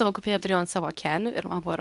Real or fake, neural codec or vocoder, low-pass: fake; codec, 24 kHz, 0.9 kbps, WavTokenizer, medium speech release version 2; 10.8 kHz